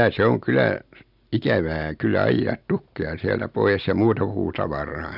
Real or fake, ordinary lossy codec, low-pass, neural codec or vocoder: real; none; 5.4 kHz; none